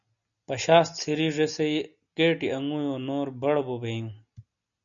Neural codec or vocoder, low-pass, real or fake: none; 7.2 kHz; real